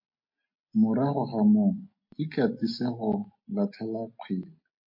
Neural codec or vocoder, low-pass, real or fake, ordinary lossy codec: none; 5.4 kHz; real; MP3, 32 kbps